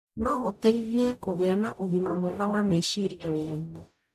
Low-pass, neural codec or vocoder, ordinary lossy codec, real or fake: 14.4 kHz; codec, 44.1 kHz, 0.9 kbps, DAC; none; fake